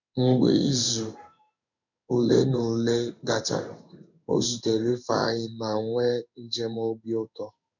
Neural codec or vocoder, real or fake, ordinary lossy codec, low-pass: codec, 16 kHz in and 24 kHz out, 1 kbps, XY-Tokenizer; fake; none; 7.2 kHz